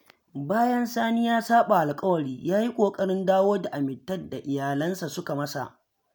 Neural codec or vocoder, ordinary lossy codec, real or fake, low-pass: none; none; real; none